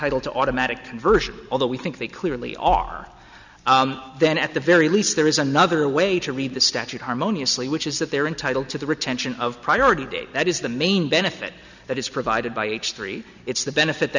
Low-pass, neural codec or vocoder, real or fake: 7.2 kHz; none; real